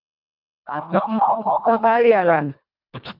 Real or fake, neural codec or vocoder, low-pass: fake; codec, 24 kHz, 1.5 kbps, HILCodec; 5.4 kHz